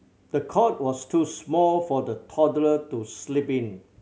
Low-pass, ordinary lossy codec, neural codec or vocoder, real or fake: none; none; none; real